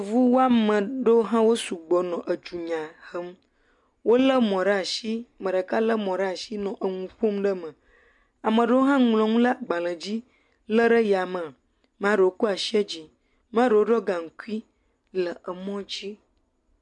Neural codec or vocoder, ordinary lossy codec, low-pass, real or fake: none; MP3, 64 kbps; 10.8 kHz; real